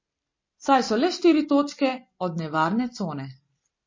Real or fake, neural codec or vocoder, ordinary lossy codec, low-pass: real; none; MP3, 32 kbps; 7.2 kHz